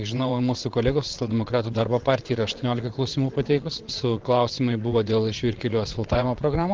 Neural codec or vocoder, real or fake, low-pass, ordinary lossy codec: vocoder, 22.05 kHz, 80 mel bands, WaveNeXt; fake; 7.2 kHz; Opus, 16 kbps